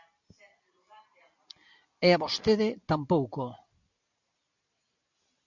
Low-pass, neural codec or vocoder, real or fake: 7.2 kHz; none; real